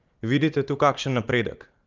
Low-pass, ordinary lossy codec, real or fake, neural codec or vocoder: 7.2 kHz; Opus, 24 kbps; fake; vocoder, 44.1 kHz, 80 mel bands, Vocos